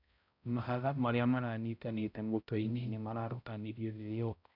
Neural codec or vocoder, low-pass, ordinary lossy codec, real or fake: codec, 16 kHz, 0.5 kbps, X-Codec, HuBERT features, trained on balanced general audio; 5.4 kHz; none; fake